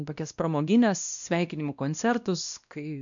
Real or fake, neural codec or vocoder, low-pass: fake; codec, 16 kHz, 1 kbps, X-Codec, WavLM features, trained on Multilingual LibriSpeech; 7.2 kHz